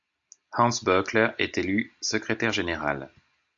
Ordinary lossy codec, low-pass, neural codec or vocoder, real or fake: AAC, 64 kbps; 7.2 kHz; none; real